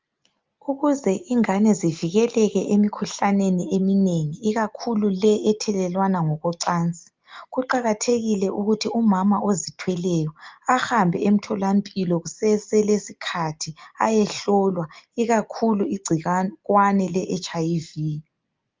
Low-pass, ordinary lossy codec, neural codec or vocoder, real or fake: 7.2 kHz; Opus, 24 kbps; none; real